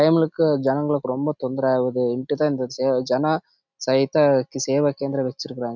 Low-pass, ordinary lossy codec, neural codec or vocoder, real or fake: 7.2 kHz; none; none; real